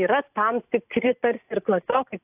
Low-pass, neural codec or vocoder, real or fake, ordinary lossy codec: 3.6 kHz; none; real; AAC, 32 kbps